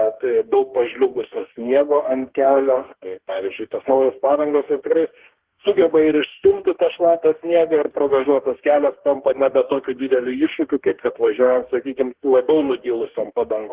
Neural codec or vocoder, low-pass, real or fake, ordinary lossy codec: codec, 44.1 kHz, 2.6 kbps, DAC; 3.6 kHz; fake; Opus, 16 kbps